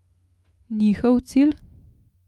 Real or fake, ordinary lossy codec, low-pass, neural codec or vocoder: fake; Opus, 32 kbps; 19.8 kHz; autoencoder, 48 kHz, 128 numbers a frame, DAC-VAE, trained on Japanese speech